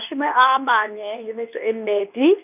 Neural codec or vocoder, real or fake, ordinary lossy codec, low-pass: codec, 24 kHz, 0.9 kbps, WavTokenizer, medium speech release version 2; fake; none; 3.6 kHz